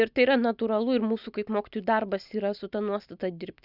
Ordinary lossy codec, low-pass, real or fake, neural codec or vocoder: Opus, 64 kbps; 5.4 kHz; real; none